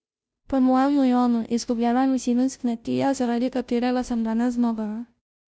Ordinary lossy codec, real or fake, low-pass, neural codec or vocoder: none; fake; none; codec, 16 kHz, 0.5 kbps, FunCodec, trained on Chinese and English, 25 frames a second